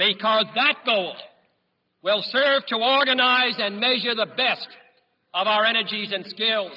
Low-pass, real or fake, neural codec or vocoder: 5.4 kHz; real; none